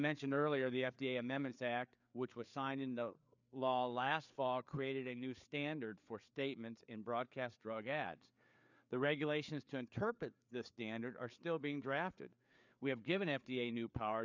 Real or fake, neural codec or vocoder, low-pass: fake; codec, 16 kHz, 4 kbps, FreqCodec, larger model; 7.2 kHz